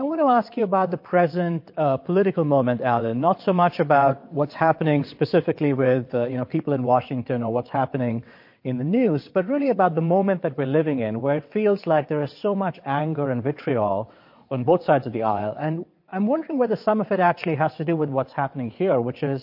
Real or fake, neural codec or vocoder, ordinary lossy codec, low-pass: fake; vocoder, 22.05 kHz, 80 mel bands, WaveNeXt; MP3, 32 kbps; 5.4 kHz